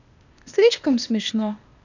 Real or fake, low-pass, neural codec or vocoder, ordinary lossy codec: fake; 7.2 kHz; codec, 16 kHz, 0.8 kbps, ZipCodec; none